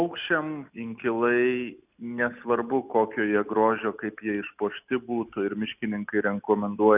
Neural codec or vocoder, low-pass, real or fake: none; 3.6 kHz; real